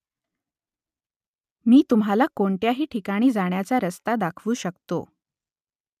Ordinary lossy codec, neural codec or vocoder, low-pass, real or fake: none; none; 14.4 kHz; real